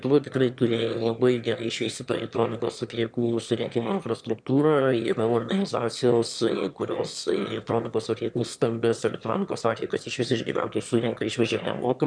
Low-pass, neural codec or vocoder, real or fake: 9.9 kHz; autoencoder, 22.05 kHz, a latent of 192 numbers a frame, VITS, trained on one speaker; fake